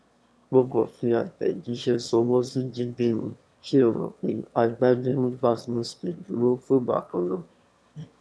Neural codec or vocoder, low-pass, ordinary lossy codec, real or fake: autoencoder, 22.05 kHz, a latent of 192 numbers a frame, VITS, trained on one speaker; none; none; fake